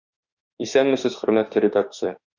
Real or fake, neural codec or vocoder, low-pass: fake; autoencoder, 48 kHz, 32 numbers a frame, DAC-VAE, trained on Japanese speech; 7.2 kHz